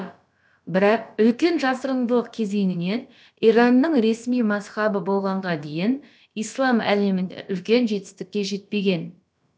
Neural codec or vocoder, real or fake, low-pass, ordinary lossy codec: codec, 16 kHz, about 1 kbps, DyCAST, with the encoder's durations; fake; none; none